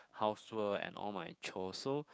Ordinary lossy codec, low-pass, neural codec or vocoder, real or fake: none; none; codec, 16 kHz, 6 kbps, DAC; fake